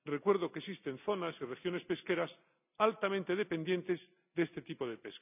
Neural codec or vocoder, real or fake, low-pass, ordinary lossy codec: none; real; 3.6 kHz; none